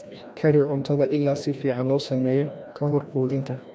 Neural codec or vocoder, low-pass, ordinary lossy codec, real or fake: codec, 16 kHz, 1 kbps, FreqCodec, larger model; none; none; fake